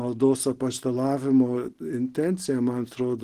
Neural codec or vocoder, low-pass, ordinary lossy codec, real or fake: none; 14.4 kHz; Opus, 16 kbps; real